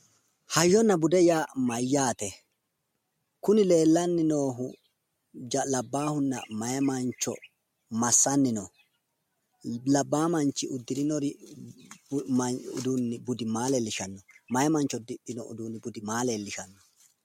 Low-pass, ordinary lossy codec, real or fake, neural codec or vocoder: 19.8 kHz; MP3, 64 kbps; real; none